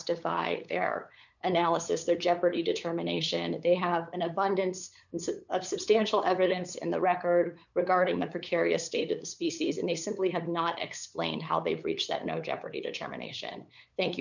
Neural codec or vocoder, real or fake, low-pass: codec, 16 kHz, 8 kbps, FunCodec, trained on LibriTTS, 25 frames a second; fake; 7.2 kHz